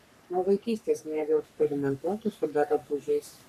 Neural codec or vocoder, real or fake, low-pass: codec, 44.1 kHz, 3.4 kbps, Pupu-Codec; fake; 14.4 kHz